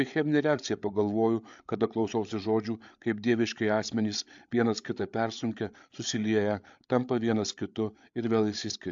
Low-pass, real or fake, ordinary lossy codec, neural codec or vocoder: 7.2 kHz; fake; AAC, 64 kbps; codec, 16 kHz, 8 kbps, FreqCodec, larger model